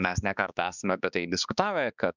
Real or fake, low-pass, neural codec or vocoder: fake; 7.2 kHz; codec, 16 kHz, 2 kbps, X-Codec, HuBERT features, trained on balanced general audio